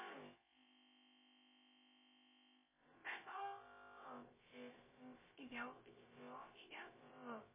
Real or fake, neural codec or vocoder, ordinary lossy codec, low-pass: fake; codec, 16 kHz, about 1 kbps, DyCAST, with the encoder's durations; MP3, 16 kbps; 3.6 kHz